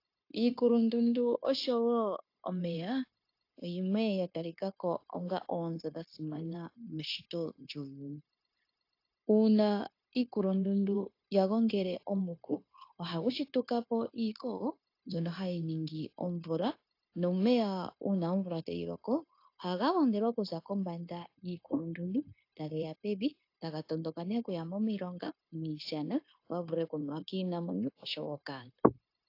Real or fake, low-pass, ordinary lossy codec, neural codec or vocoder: fake; 5.4 kHz; AAC, 32 kbps; codec, 16 kHz, 0.9 kbps, LongCat-Audio-Codec